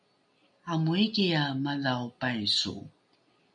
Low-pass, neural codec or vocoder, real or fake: 9.9 kHz; none; real